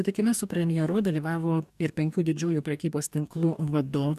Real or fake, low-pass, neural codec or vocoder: fake; 14.4 kHz; codec, 44.1 kHz, 2.6 kbps, DAC